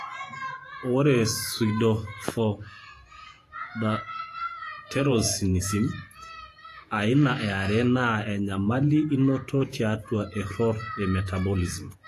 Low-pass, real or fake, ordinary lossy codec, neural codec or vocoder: 14.4 kHz; fake; AAC, 48 kbps; vocoder, 48 kHz, 128 mel bands, Vocos